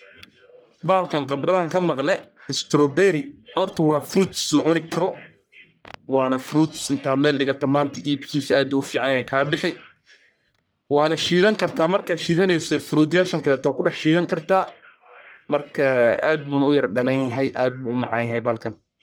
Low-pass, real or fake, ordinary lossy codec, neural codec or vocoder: none; fake; none; codec, 44.1 kHz, 1.7 kbps, Pupu-Codec